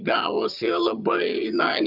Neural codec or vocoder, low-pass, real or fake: vocoder, 22.05 kHz, 80 mel bands, HiFi-GAN; 5.4 kHz; fake